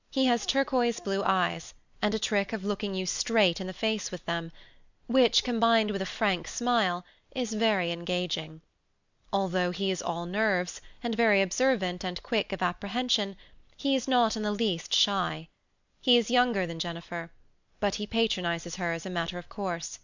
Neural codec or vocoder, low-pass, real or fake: none; 7.2 kHz; real